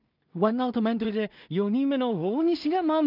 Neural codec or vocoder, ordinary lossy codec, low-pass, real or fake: codec, 16 kHz in and 24 kHz out, 0.4 kbps, LongCat-Audio-Codec, two codebook decoder; none; 5.4 kHz; fake